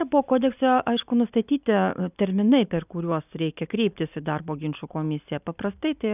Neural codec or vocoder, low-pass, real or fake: none; 3.6 kHz; real